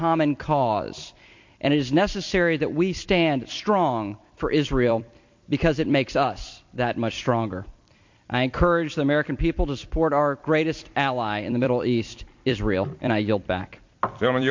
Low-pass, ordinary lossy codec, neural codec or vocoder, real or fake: 7.2 kHz; MP3, 48 kbps; vocoder, 44.1 kHz, 128 mel bands every 256 samples, BigVGAN v2; fake